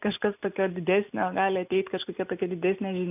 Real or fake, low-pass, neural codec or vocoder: real; 3.6 kHz; none